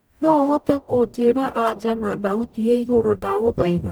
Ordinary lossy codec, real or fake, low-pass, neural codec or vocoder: none; fake; none; codec, 44.1 kHz, 0.9 kbps, DAC